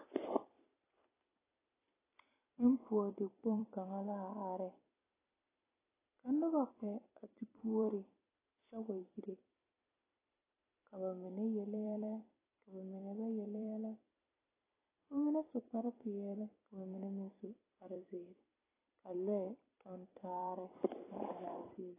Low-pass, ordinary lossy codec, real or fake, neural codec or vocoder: 3.6 kHz; AAC, 16 kbps; fake; vocoder, 44.1 kHz, 128 mel bands every 256 samples, BigVGAN v2